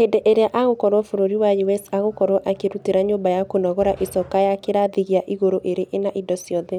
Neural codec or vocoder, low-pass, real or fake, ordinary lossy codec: none; 19.8 kHz; real; none